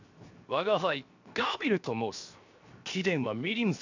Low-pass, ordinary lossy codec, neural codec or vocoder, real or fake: 7.2 kHz; AAC, 48 kbps; codec, 16 kHz, 0.7 kbps, FocalCodec; fake